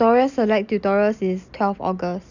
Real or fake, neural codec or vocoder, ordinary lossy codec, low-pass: real; none; none; 7.2 kHz